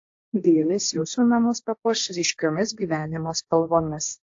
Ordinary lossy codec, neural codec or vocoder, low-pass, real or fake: AAC, 48 kbps; codec, 16 kHz, 1.1 kbps, Voila-Tokenizer; 7.2 kHz; fake